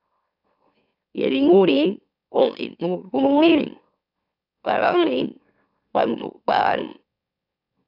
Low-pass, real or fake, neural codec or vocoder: 5.4 kHz; fake; autoencoder, 44.1 kHz, a latent of 192 numbers a frame, MeloTTS